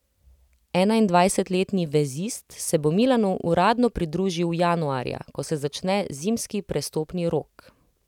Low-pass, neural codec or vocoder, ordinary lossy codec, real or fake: 19.8 kHz; none; none; real